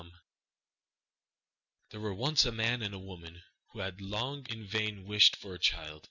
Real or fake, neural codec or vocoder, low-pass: real; none; 7.2 kHz